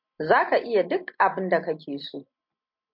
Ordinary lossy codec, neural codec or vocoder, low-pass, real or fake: MP3, 48 kbps; none; 5.4 kHz; real